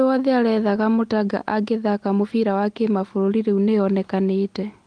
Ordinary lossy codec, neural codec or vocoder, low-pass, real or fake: Opus, 24 kbps; none; 9.9 kHz; real